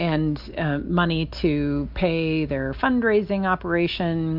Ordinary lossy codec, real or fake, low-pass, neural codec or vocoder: MP3, 48 kbps; real; 5.4 kHz; none